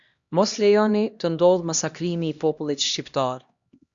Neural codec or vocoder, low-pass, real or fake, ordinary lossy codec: codec, 16 kHz, 1 kbps, X-Codec, HuBERT features, trained on LibriSpeech; 7.2 kHz; fake; Opus, 64 kbps